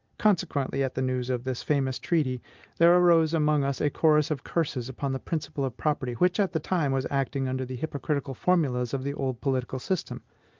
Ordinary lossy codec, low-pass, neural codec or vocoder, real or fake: Opus, 32 kbps; 7.2 kHz; none; real